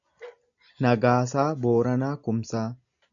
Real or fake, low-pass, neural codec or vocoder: real; 7.2 kHz; none